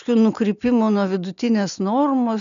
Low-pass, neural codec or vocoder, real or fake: 7.2 kHz; none; real